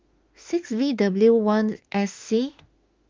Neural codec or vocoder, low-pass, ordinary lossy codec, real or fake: autoencoder, 48 kHz, 32 numbers a frame, DAC-VAE, trained on Japanese speech; 7.2 kHz; Opus, 32 kbps; fake